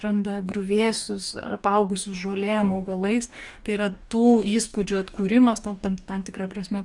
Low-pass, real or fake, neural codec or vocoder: 10.8 kHz; fake; codec, 44.1 kHz, 2.6 kbps, DAC